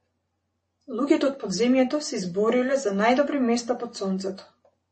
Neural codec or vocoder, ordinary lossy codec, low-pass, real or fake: none; MP3, 32 kbps; 10.8 kHz; real